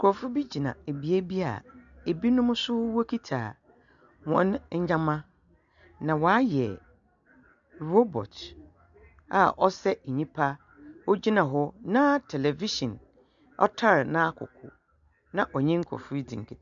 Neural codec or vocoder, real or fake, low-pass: none; real; 7.2 kHz